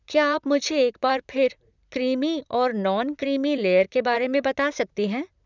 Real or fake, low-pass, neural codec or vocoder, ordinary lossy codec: fake; 7.2 kHz; vocoder, 44.1 kHz, 128 mel bands, Pupu-Vocoder; none